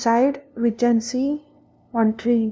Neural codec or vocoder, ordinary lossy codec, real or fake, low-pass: codec, 16 kHz, 0.5 kbps, FunCodec, trained on LibriTTS, 25 frames a second; none; fake; none